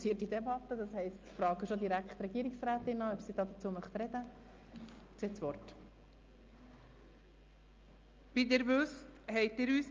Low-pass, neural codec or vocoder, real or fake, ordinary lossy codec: 7.2 kHz; none; real; Opus, 24 kbps